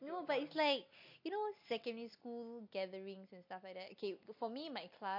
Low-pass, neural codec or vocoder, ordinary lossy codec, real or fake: 5.4 kHz; none; MP3, 32 kbps; real